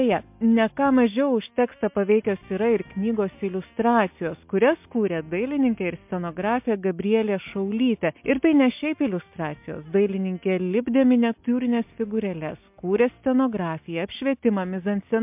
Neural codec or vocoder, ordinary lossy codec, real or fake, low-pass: none; MP3, 32 kbps; real; 3.6 kHz